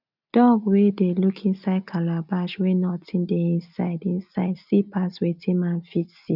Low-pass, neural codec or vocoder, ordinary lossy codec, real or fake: 5.4 kHz; none; none; real